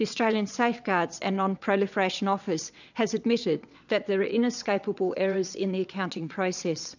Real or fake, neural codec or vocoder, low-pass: fake; vocoder, 22.05 kHz, 80 mel bands, WaveNeXt; 7.2 kHz